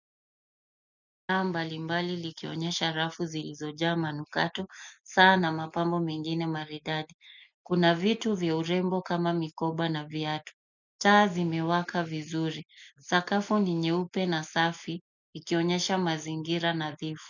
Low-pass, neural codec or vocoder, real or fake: 7.2 kHz; none; real